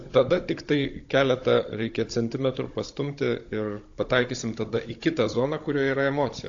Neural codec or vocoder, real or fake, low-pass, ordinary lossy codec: codec, 16 kHz, 16 kbps, FunCodec, trained on Chinese and English, 50 frames a second; fake; 7.2 kHz; AAC, 48 kbps